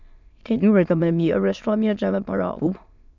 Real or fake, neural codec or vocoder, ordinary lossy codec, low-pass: fake; autoencoder, 22.05 kHz, a latent of 192 numbers a frame, VITS, trained on many speakers; none; 7.2 kHz